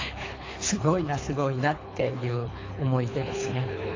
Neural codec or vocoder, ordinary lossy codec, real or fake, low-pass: codec, 24 kHz, 3 kbps, HILCodec; AAC, 32 kbps; fake; 7.2 kHz